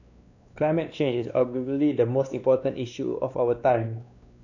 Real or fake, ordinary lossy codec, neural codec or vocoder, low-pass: fake; none; codec, 16 kHz, 2 kbps, X-Codec, WavLM features, trained on Multilingual LibriSpeech; 7.2 kHz